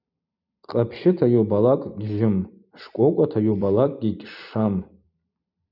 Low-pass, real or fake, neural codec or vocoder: 5.4 kHz; fake; vocoder, 44.1 kHz, 128 mel bands every 256 samples, BigVGAN v2